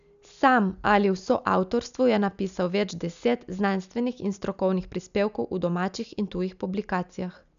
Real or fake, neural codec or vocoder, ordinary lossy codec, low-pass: real; none; MP3, 96 kbps; 7.2 kHz